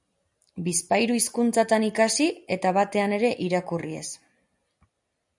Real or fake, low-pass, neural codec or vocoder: real; 10.8 kHz; none